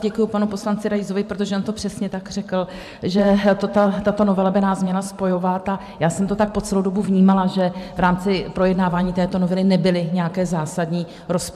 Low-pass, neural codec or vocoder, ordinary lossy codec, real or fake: 14.4 kHz; vocoder, 44.1 kHz, 128 mel bands every 512 samples, BigVGAN v2; MP3, 96 kbps; fake